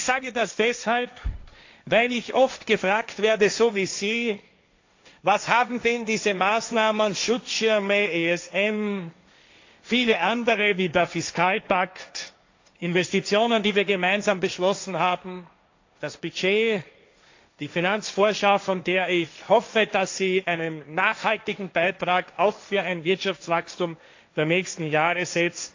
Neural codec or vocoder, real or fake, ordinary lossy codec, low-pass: codec, 16 kHz, 1.1 kbps, Voila-Tokenizer; fake; AAC, 48 kbps; 7.2 kHz